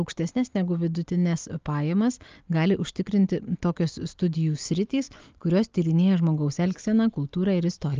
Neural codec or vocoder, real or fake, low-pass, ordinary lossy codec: none; real; 7.2 kHz; Opus, 24 kbps